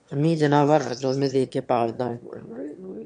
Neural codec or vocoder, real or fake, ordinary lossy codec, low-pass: autoencoder, 22.05 kHz, a latent of 192 numbers a frame, VITS, trained on one speaker; fake; MP3, 64 kbps; 9.9 kHz